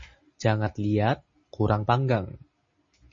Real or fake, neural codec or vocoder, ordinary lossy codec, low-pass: real; none; MP3, 32 kbps; 7.2 kHz